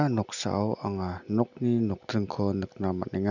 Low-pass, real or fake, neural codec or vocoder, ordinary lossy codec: 7.2 kHz; real; none; none